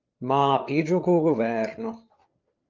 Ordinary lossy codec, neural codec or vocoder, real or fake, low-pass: Opus, 32 kbps; codec, 16 kHz, 8 kbps, FreqCodec, larger model; fake; 7.2 kHz